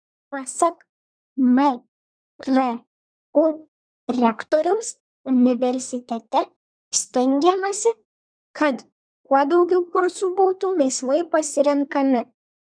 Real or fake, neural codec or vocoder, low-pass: fake; codec, 24 kHz, 1 kbps, SNAC; 9.9 kHz